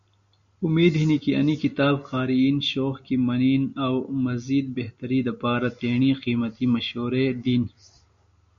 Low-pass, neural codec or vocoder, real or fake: 7.2 kHz; none; real